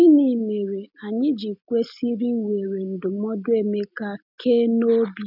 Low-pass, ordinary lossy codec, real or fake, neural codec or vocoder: 5.4 kHz; none; real; none